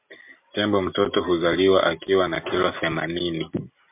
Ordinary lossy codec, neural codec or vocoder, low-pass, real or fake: MP3, 32 kbps; none; 3.6 kHz; real